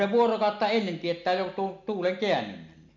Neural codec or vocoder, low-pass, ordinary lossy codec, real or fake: none; 7.2 kHz; MP3, 64 kbps; real